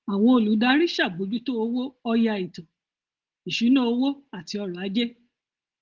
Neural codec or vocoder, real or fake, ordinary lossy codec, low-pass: none; real; Opus, 16 kbps; 7.2 kHz